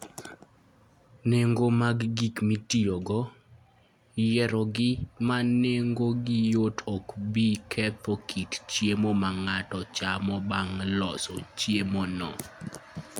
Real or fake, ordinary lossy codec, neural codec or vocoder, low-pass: real; none; none; 19.8 kHz